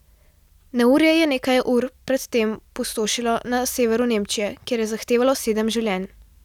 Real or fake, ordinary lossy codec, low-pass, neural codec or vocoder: real; none; 19.8 kHz; none